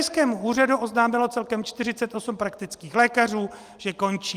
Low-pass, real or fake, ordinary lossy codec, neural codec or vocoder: 14.4 kHz; real; Opus, 32 kbps; none